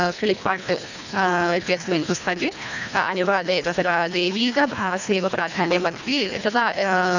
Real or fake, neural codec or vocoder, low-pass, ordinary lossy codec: fake; codec, 24 kHz, 1.5 kbps, HILCodec; 7.2 kHz; none